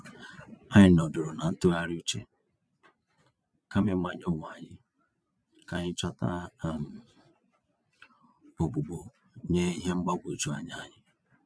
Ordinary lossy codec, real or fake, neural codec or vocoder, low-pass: none; fake; vocoder, 22.05 kHz, 80 mel bands, Vocos; none